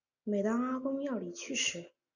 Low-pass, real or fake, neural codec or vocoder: 7.2 kHz; real; none